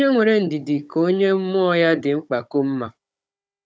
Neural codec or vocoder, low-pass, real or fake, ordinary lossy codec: codec, 16 kHz, 16 kbps, FunCodec, trained on Chinese and English, 50 frames a second; none; fake; none